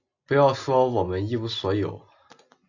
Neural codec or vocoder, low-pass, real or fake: none; 7.2 kHz; real